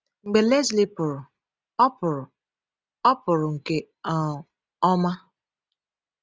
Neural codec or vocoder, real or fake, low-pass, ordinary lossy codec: none; real; none; none